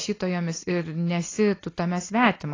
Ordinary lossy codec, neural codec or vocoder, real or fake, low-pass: AAC, 32 kbps; none; real; 7.2 kHz